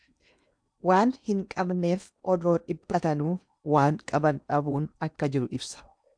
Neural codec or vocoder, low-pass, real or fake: codec, 16 kHz in and 24 kHz out, 0.8 kbps, FocalCodec, streaming, 65536 codes; 9.9 kHz; fake